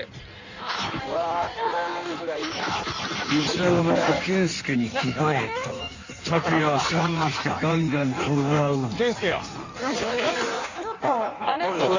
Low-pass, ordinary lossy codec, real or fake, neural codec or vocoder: 7.2 kHz; Opus, 64 kbps; fake; codec, 16 kHz in and 24 kHz out, 1.1 kbps, FireRedTTS-2 codec